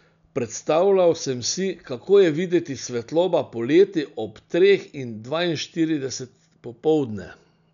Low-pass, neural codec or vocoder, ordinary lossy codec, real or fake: 7.2 kHz; none; none; real